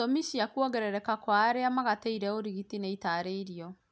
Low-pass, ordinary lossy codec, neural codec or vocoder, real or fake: none; none; none; real